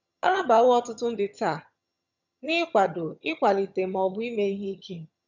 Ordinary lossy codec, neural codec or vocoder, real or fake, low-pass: none; vocoder, 22.05 kHz, 80 mel bands, HiFi-GAN; fake; 7.2 kHz